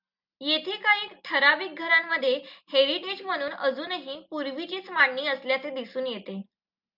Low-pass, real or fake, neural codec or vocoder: 5.4 kHz; real; none